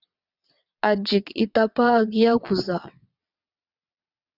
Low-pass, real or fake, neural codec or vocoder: 5.4 kHz; fake; vocoder, 22.05 kHz, 80 mel bands, WaveNeXt